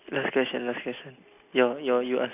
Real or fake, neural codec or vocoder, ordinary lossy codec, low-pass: real; none; none; 3.6 kHz